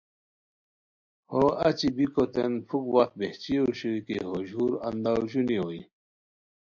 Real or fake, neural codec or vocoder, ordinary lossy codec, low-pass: real; none; MP3, 64 kbps; 7.2 kHz